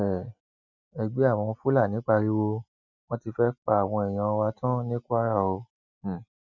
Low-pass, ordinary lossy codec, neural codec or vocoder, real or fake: 7.2 kHz; none; none; real